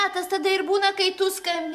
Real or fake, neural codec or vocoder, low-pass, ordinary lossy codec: real; none; 14.4 kHz; MP3, 96 kbps